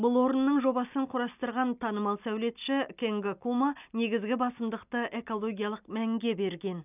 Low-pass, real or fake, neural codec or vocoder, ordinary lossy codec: 3.6 kHz; real; none; none